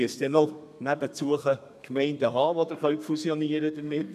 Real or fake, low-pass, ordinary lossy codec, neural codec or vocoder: fake; 14.4 kHz; none; codec, 32 kHz, 1.9 kbps, SNAC